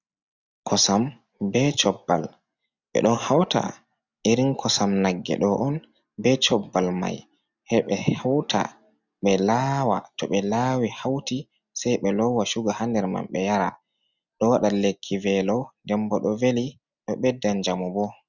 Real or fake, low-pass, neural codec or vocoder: real; 7.2 kHz; none